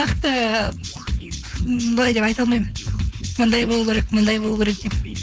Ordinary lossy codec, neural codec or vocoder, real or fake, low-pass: none; codec, 16 kHz, 4.8 kbps, FACodec; fake; none